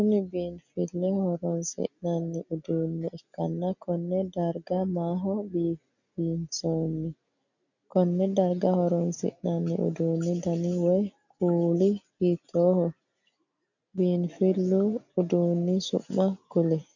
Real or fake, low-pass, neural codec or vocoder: real; 7.2 kHz; none